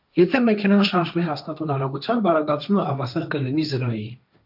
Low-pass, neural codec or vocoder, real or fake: 5.4 kHz; codec, 16 kHz, 1.1 kbps, Voila-Tokenizer; fake